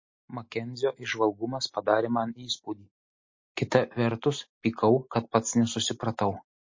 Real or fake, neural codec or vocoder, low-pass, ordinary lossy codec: real; none; 7.2 kHz; MP3, 32 kbps